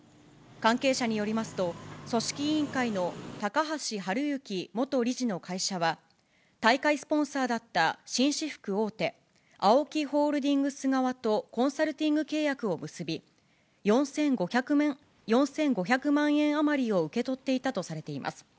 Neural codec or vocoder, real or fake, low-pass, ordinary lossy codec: none; real; none; none